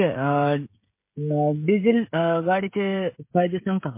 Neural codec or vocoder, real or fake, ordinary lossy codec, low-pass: codec, 16 kHz, 16 kbps, FreqCodec, smaller model; fake; MP3, 24 kbps; 3.6 kHz